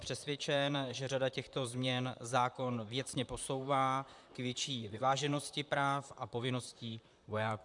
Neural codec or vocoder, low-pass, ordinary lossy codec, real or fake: vocoder, 44.1 kHz, 128 mel bands, Pupu-Vocoder; 10.8 kHz; MP3, 96 kbps; fake